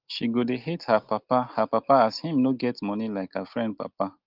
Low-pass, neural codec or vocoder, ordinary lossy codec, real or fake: 5.4 kHz; none; Opus, 32 kbps; real